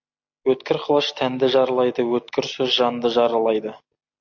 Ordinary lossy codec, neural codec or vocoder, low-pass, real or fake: MP3, 48 kbps; none; 7.2 kHz; real